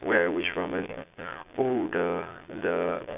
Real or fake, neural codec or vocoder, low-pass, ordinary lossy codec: fake; vocoder, 22.05 kHz, 80 mel bands, Vocos; 3.6 kHz; AAC, 32 kbps